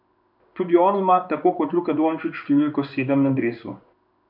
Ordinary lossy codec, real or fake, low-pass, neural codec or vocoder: none; fake; 5.4 kHz; codec, 16 kHz in and 24 kHz out, 1 kbps, XY-Tokenizer